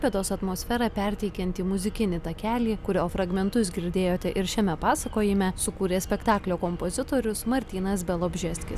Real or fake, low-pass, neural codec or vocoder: real; 14.4 kHz; none